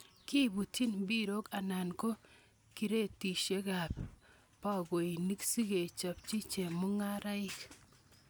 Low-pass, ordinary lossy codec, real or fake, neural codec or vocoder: none; none; real; none